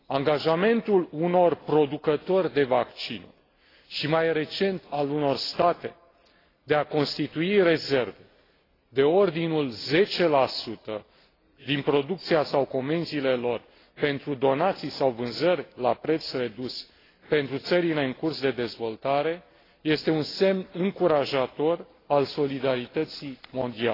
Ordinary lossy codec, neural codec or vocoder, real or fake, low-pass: AAC, 24 kbps; none; real; 5.4 kHz